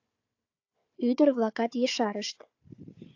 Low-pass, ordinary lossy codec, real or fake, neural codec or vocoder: 7.2 kHz; AAC, 48 kbps; fake; codec, 16 kHz, 4 kbps, FunCodec, trained on Chinese and English, 50 frames a second